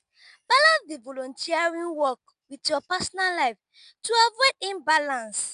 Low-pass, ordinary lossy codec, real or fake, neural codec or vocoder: 10.8 kHz; none; real; none